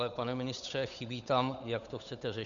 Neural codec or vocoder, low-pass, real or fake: codec, 16 kHz, 8 kbps, FunCodec, trained on Chinese and English, 25 frames a second; 7.2 kHz; fake